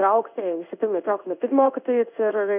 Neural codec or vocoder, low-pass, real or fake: codec, 16 kHz in and 24 kHz out, 1 kbps, XY-Tokenizer; 3.6 kHz; fake